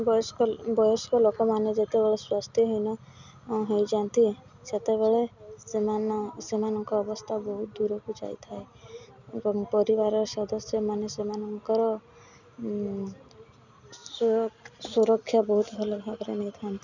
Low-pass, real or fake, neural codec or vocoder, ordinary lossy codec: 7.2 kHz; real; none; none